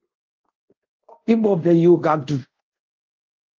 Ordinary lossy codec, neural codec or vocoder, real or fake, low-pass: Opus, 32 kbps; codec, 24 kHz, 0.5 kbps, DualCodec; fake; 7.2 kHz